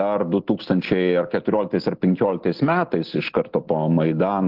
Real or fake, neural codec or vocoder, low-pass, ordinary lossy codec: real; none; 5.4 kHz; Opus, 16 kbps